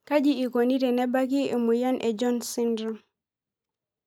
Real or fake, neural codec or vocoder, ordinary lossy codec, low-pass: real; none; none; 19.8 kHz